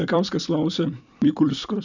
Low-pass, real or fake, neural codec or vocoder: 7.2 kHz; fake; vocoder, 44.1 kHz, 128 mel bands every 256 samples, BigVGAN v2